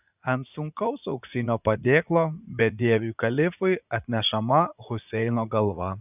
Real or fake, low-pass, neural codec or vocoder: fake; 3.6 kHz; vocoder, 44.1 kHz, 80 mel bands, Vocos